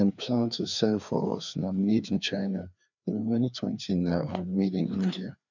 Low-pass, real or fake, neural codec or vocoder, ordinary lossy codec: 7.2 kHz; fake; codec, 16 kHz, 2 kbps, FreqCodec, larger model; none